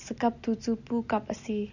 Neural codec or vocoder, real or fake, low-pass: none; real; 7.2 kHz